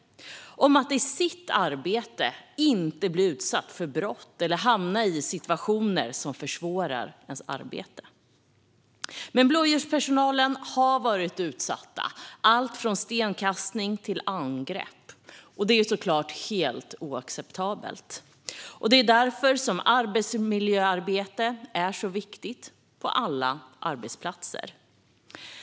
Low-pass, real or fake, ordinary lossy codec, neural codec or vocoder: none; real; none; none